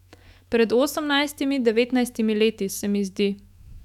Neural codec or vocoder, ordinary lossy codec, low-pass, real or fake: autoencoder, 48 kHz, 128 numbers a frame, DAC-VAE, trained on Japanese speech; none; 19.8 kHz; fake